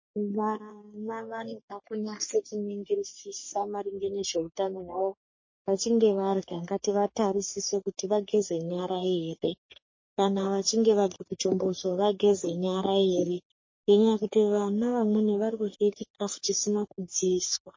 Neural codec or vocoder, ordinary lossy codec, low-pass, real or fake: codec, 44.1 kHz, 3.4 kbps, Pupu-Codec; MP3, 32 kbps; 7.2 kHz; fake